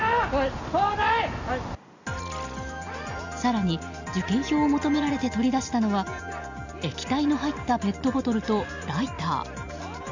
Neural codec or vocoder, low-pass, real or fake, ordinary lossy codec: none; 7.2 kHz; real; Opus, 64 kbps